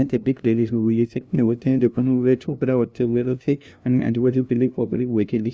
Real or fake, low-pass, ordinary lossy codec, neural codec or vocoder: fake; none; none; codec, 16 kHz, 0.5 kbps, FunCodec, trained on LibriTTS, 25 frames a second